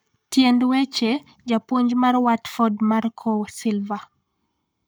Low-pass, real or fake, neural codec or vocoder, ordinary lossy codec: none; fake; codec, 44.1 kHz, 7.8 kbps, Pupu-Codec; none